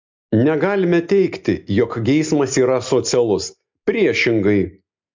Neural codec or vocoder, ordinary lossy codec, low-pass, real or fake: none; MP3, 64 kbps; 7.2 kHz; real